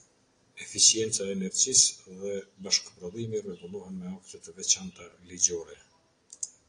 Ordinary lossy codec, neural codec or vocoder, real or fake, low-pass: AAC, 48 kbps; none; real; 9.9 kHz